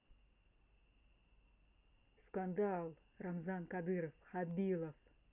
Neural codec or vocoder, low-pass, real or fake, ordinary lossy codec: none; 3.6 kHz; real; Opus, 64 kbps